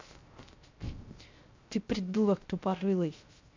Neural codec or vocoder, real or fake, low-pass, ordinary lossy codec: codec, 16 kHz, 0.3 kbps, FocalCodec; fake; 7.2 kHz; MP3, 64 kbps